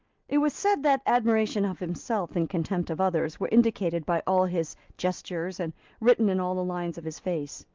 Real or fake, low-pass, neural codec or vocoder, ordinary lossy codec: real; 7.2 kHz; none; Opus, 24 kbps